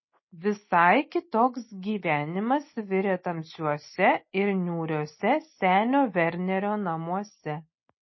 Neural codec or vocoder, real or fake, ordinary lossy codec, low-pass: none; real; MP3, 24 kbps; 7.2 kHz